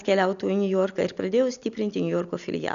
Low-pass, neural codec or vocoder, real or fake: 7.2 kHz; none; real